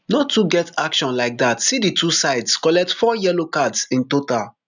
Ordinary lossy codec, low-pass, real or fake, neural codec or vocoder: none; 7.2 kHz; real; none